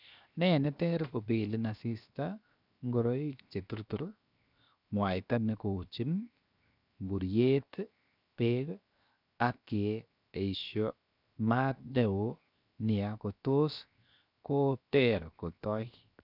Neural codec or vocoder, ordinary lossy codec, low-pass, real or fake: codec, 16 kHz, 0.7 kbps, FocalCodec; none; 5.4 kHz; fake